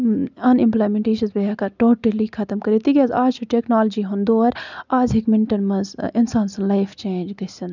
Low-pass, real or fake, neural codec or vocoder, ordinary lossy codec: 7.2 kHz; real; none; none